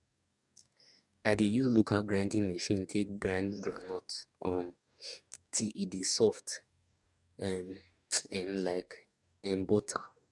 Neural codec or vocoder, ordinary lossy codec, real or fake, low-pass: codec, 44.1 kHz, 2.6 kbps, DAC; none; fake; 10.8 kHz